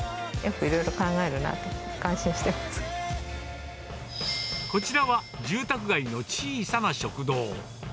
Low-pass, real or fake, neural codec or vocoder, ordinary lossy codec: none; real; none; none